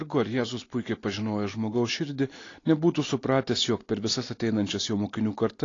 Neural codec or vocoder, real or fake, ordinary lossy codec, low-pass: none; real; AAC, 32 kbps; 7.2 kHz